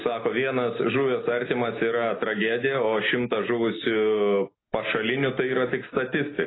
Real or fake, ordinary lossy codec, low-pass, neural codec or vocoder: real; AAC, 16 kbps; 7.2 kHz; none